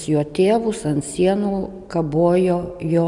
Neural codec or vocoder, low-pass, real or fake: none; 10.8 kHz; real